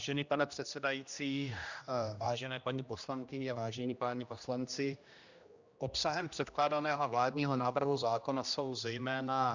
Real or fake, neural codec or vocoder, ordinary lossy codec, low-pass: fake; codec, 16 kHz, 1 kbps, X-Codec, HuBERT features, trained on general audio; Opus, 64 kbps; 7.2 kHz